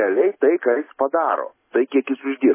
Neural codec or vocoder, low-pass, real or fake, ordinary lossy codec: none; 3.6 kHz; real; MP3, 16 kbps